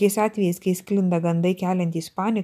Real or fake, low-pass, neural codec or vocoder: real; 14.4 kHz; none